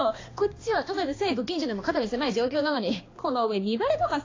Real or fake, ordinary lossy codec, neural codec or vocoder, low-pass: fake; AAC, 32 kbps; codec, 16 kHz, 2 kbps, X-Codec, HuBERT features, trained on balanced general audio; 7.2 kHz